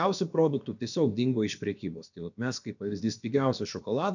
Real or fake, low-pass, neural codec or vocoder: fake; 7.2 kHz; codec, 16 kHz, about 1 kbps, DyCAST, with the encoder's durations